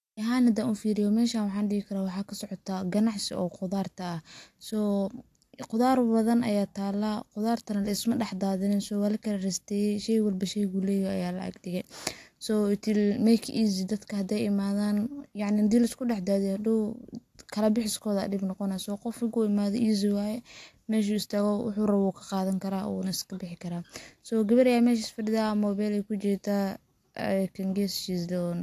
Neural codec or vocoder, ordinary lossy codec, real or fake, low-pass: none; AAC, 64 kbps; real; 14.4 kHz